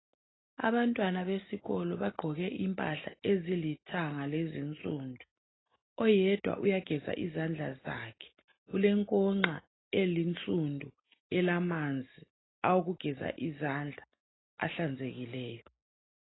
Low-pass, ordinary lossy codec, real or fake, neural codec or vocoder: 7.2 kHz; AAC, 16 kbps; real; none